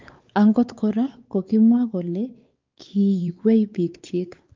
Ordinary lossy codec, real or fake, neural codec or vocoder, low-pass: Opus, 24 kbps; fake; codec, 16 kHz, 4 kbps, X-Codec, WavLM features, trained on Multilingual LibriSpeech; 7.2 kHz